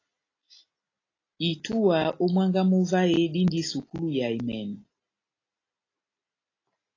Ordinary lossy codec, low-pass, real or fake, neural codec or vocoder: AAC, 32 kbps; 7.2 kHz; real; none